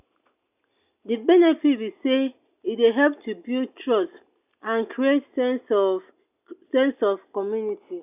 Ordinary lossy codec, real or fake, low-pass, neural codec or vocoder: none; real; 3.6 kHz; none